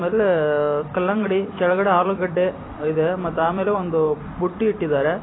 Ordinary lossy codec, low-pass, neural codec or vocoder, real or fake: AAC, 16 kbps; 7.2 kHz; vocoder, 44.1 kHz, 128 mel bands every 256 samples, BigVGAN v2; fake